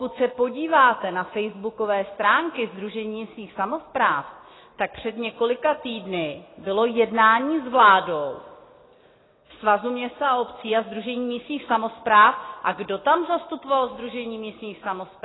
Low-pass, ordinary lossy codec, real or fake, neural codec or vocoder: 7.2 kHz; AAC, 16 kbps; real; none